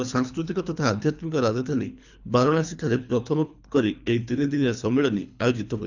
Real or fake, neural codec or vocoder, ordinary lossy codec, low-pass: fake; codec, 24 kHz, 3 kbps, HILCodec; none; 7.2 kHz